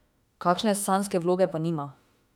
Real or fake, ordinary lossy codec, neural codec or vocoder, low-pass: fake; none; autoencoder, 48 kHz, 32 numbers a frame, DAC-VAE, trained on Japanese speech; 19.8 kHz